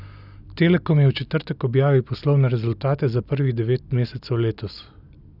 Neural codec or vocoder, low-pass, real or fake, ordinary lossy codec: none; 5.4 kHz; real; none